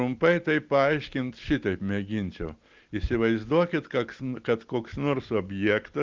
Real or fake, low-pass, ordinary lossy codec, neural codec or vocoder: real; 7.2 kHz; Opus, 32 kbps; none